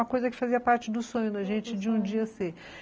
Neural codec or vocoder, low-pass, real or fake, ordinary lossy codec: none; none; real; none